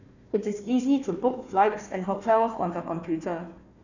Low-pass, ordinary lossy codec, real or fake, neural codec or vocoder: 7.2 kHz; none; fake; codec, 16 kHz, 1 kbps, FunCodec, trained on Chinese and English, 50 frames a second